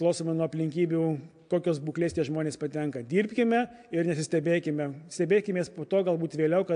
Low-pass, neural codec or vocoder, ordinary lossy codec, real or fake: 9.9 kHz; none; AAC, 64 kbps; real